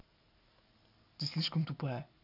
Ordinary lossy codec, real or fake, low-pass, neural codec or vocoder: none; real; 5.4 kHz; none